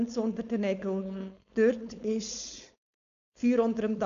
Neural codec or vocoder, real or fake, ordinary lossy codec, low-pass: codec, 16 kHz, 4.8 kbps, FACodec; fake; none; 7.2 kHz